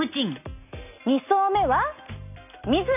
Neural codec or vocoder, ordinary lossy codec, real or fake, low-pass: none; none; real; 3.6 kHz